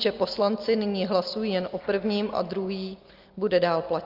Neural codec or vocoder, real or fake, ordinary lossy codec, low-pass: none; real; Opus, 24 kbps; 5.4 kHz